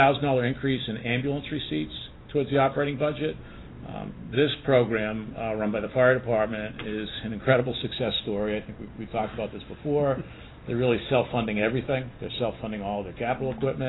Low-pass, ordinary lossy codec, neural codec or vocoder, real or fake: 7.2 kHz; AAC, 16 kbps; none; real